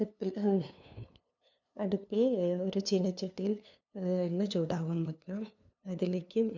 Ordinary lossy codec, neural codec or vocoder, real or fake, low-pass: none; codec, 16 kHz, 2 kbps, FunCodec, trained on LibriTTS, 25 frames a second; fake; 7.2 kHz